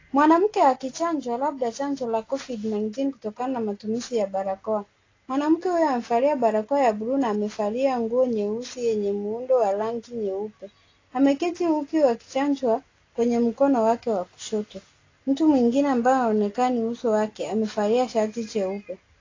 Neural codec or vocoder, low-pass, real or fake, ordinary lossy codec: none; 7.2 kHz; real; AAC, 32 kbps